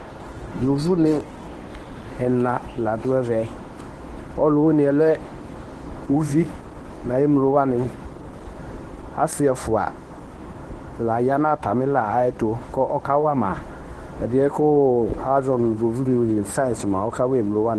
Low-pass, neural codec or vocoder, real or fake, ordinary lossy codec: 10.8 kHz; codec, 24 kHz, 0.9 kbps, WavTokenizer, medium speech release version 1; fake; Opus, 16 kbps